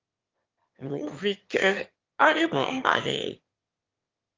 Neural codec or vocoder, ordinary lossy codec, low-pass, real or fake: autoencoder, 22.05 kHz, a latent of 192 numbers a frame, VITS, trained on one speaker; Opus, 32 kbps; 7.2 kHz; fake